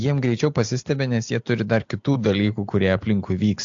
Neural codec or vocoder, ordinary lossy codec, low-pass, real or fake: none; AAC, 64 kbps; 7.2 kHz; real